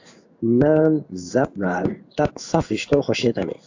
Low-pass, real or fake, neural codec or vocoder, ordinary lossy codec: 7.2 kHz; fake; codec, 16 kHz in and 24 kHz out, 1 kbps, XY-Tokenizer; AAC, 48 kbps